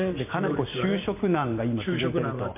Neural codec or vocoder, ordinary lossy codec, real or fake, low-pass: vocoder, 44.1 kHz, 128 mel bands every 512 samples, BigVGAN v2; MP3, 32 kbps; fake; 3.6 kHz